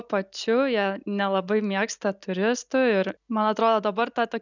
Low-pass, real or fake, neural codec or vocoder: 7.2 kHz; real; none